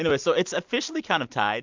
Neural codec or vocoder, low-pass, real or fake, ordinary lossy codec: none; 7.2 kHz; real; MP3, 64 kbps